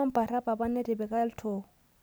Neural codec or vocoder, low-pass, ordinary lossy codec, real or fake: none; none; none; real